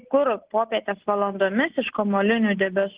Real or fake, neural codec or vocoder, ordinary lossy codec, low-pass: real; none; Opus, 16 kbps; 3.6 kHz